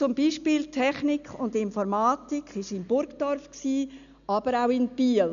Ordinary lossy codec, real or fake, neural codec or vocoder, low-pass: none; real; none; 7.2 kHz